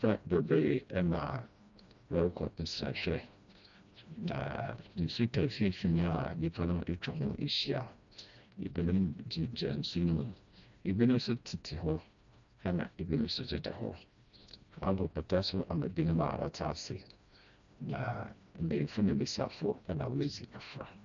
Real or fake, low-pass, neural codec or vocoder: fake; 7.2 kHz; codec, 16 kHz, 1 kbps, FreqCodec, smaller model